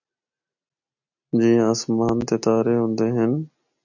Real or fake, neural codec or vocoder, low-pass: real; none; 7.2 kHz